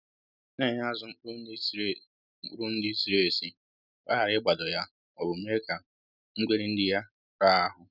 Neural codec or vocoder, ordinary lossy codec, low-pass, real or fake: none; none; 5.4 kHz; real